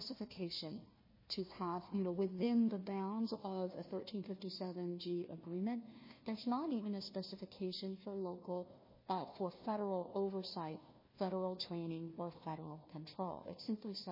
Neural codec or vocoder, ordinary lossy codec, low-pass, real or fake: codec, 16 kHz, 1 kbps, FunCodec, trained on Chinese and English, 50 frames a second; MP3, 24 kbps; 5.4 kHz; fake